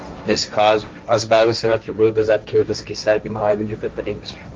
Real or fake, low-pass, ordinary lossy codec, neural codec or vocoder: fake; 7.2 kHz; Opus, 32 kbps; codec, 16 kHz, 1.1 kbps, Voila-Tokenizer